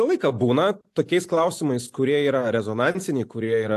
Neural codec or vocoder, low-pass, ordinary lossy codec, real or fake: none; 14.4 kHz; AAC, 64 kbps; real